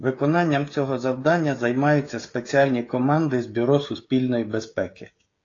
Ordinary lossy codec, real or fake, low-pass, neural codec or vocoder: AAC, 32 kbps; fake; 7.2 kHz; codec, 16 kHz, 16 kbps, FreqCodec, smaller model